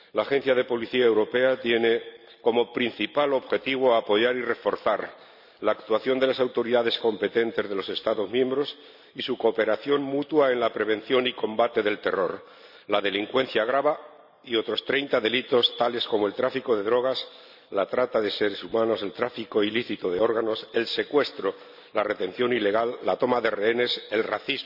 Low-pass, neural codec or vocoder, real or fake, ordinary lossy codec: 5.4 kHz; none; real; none